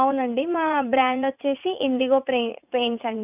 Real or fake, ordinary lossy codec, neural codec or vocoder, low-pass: fake; none; vocoder, 44.1 kHz, 80 mel bands, Vocos; 3.6 kHz